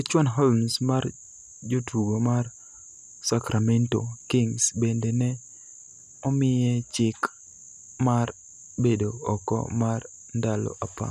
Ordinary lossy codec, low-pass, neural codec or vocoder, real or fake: none; 14.4 kHz; none; real